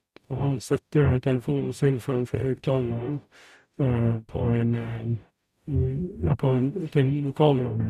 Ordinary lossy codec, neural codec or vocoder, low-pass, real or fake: none; codec, 44.1 kHz, 0.9 kbps, DAC; 14.4 kHz; fake